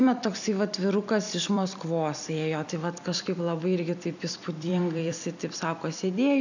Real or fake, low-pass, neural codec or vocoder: fake; 7.2 kHz; vocoder, 44.1 kHz, 128 mel bands every 256 samples, BigVGAN v2